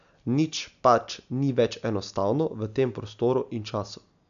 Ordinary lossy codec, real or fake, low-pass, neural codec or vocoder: none; real; 7.2 kHz; none